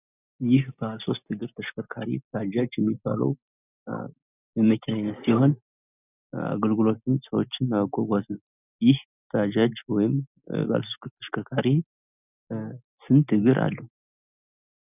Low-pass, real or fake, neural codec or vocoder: 3.6 kHz; real; none